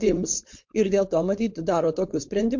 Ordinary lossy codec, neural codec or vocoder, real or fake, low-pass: MP3, 48 kbps; codec, 16 kHz, 4.8 kbps, FACodec; fake; 7.2 kHz